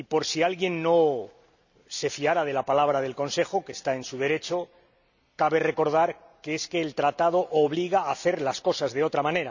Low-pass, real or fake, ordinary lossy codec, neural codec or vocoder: 7.2 kHz; real; none; none